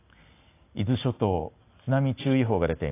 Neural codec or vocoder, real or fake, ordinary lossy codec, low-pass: none; real; AAC, 24 kbps; 3.6 kHz